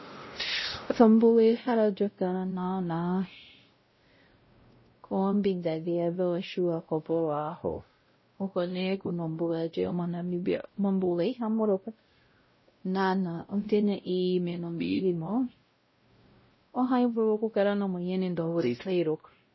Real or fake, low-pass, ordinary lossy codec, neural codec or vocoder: fake; 7.2 kHz; MP3, 24 kbps; codec, 16 kHz, 0.5 kbps, X-Codec, WavLM features, trained on Multilingual LibriSpeech